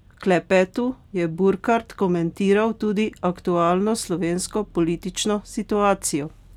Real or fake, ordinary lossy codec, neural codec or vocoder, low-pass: real; none; none; 19.8 kHz